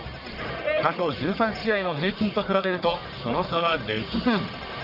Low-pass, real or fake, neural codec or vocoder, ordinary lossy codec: 5.4 kHz; fake; codec, 44.1 kHz, 1.7 kbps, Pupu-Codec; none